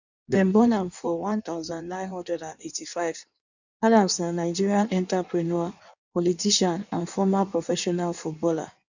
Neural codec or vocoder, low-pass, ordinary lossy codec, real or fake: codec, 16 kHz in and 24 kHz out, 1.1 kbps, FireRedTTS-2 codec; 7.2 kHz; none; fake